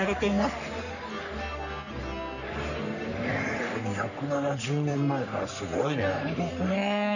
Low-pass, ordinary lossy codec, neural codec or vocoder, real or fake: 7.2 kHz; none; codec, 44.1 kHz, 3.4 kbps, Pupu-Codec; fake